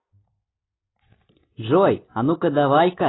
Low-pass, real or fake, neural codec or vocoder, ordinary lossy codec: 7.2 kHz; real; none; AAC, 16 kbps